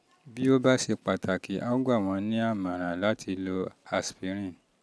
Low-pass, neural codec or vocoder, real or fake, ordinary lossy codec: none; none; real; none